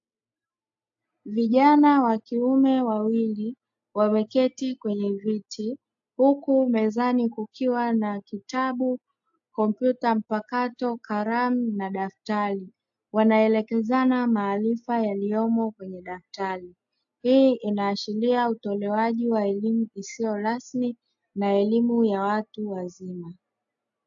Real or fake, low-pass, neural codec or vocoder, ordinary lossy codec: real; 7.2 kHz; none; AAC, 64 kbps